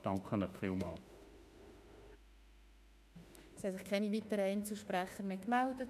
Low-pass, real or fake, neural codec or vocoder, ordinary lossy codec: 14.4 kHz; fake; autoencoder, 48 kHz, 32 numbers a frame, DAC-VAE, trained on Japanese speech; none